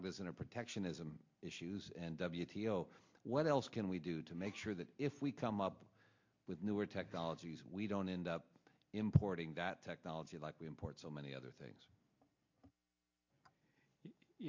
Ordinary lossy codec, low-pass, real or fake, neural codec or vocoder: MP3, 48 kbps; 7.2 kHz; real; none